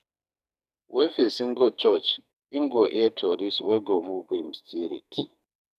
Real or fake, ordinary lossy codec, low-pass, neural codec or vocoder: fake; none; 14.4 kHz; codec, 44.1 kHz, 2.6 kbps, SNAC